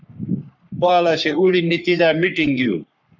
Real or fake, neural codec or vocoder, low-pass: fake; codec, 44.1 kHz, 3.4 kbps, Pupu-Codec; 7.2 kHz